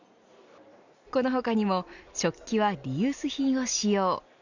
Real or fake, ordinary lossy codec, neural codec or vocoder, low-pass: real; none; none; 7.2 kHz